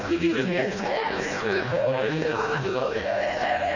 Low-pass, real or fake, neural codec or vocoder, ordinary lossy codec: 7.2 kHz; fake; codec, 16 kHz, 1 kbps, FreqCodec, smaller model; none